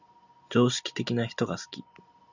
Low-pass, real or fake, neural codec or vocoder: 7.2 kHz; real; none